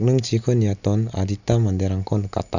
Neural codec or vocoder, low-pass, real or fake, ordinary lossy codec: none; 7.2 kHz; real; none